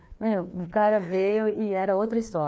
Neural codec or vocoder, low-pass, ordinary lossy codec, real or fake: codec, 16 kHz, 2 kbps, FreqCodec, larger model; none; none; fake